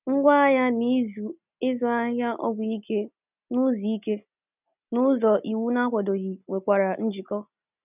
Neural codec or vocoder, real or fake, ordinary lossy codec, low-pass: none; real; none; 3.6 kHz